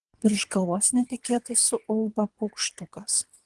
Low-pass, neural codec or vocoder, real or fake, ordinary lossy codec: 10.8 kHz; codec, 24 kHz, 3 kbps, HILCodec; fake; Opus, 24 kbps